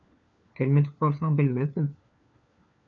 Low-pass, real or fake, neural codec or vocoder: 7.2 kHz; fake; codec, 16 kHz, 4 kbps, FunCodec, trained on LibriTTS, 50 frames a second